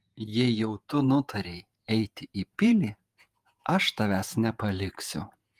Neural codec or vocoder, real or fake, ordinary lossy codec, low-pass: vocoder, 48 kHz, 128 mel bands, Vocos; fake; Opus, 32 kbps; 14.4 kHz